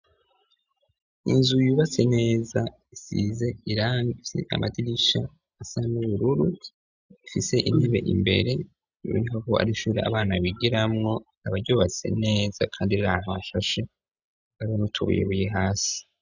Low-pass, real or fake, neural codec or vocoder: 7.2 kHz; real; none